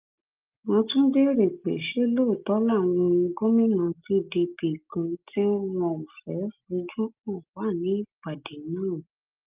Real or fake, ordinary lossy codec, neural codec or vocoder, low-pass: real; Opus, 24 kbps; none; 3.6 kHz